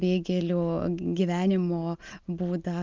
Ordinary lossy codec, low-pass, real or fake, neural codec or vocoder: Opus, 24 kbps; 7.2 kHz; real; none